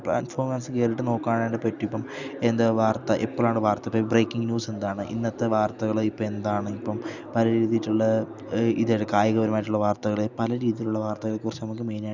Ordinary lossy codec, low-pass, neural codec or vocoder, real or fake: none; 7.2 kHz; none; real